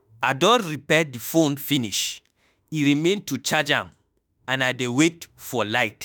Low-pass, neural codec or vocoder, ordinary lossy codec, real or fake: none; autoencoder, 48 kHz, 32 numbers a frame, DAC-VAE, trained on Japanese speech; none; fake